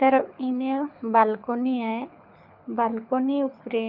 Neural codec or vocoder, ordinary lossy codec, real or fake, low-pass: codec, 24 kHz, 6 kbps, HILCodec; none; fake; 5.4 kHz